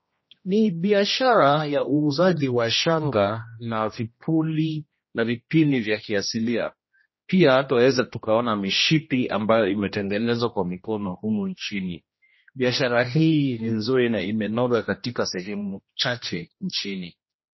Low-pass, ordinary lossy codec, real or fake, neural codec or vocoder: 7.2 kHz; MP3, 24 kbps; fake; codec, 16 kHz, 1 kbps, X-Codec, HuBERT features, trained on general audio